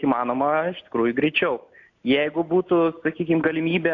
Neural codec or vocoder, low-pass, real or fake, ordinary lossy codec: none; 7.2 kHz; real; AAC, 48 kbps